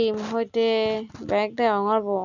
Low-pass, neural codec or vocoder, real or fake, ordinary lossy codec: 7.2 kHz; none; real; none